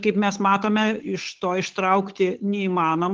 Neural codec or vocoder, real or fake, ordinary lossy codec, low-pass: codec, 16 kHz, 8 kbps, FunCodec, trained on Chinese and English, 25 frames a second; fake; Opus, 16 kbps; 7.2 kHz